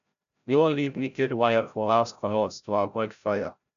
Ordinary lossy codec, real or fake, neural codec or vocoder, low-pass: none; fake; codec, 16 kHz, 0.5 kbps, FreqCodec, larger model; 7.2 kHz